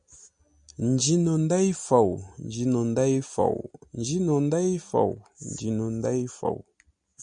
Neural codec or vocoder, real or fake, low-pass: none; real; 9.9 kHz